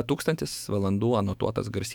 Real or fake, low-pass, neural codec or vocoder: fake; 19.8 kHz; autoencoder, 48 kHz, 128 numbers a frame, DAC-VAE, trained on Japanese speech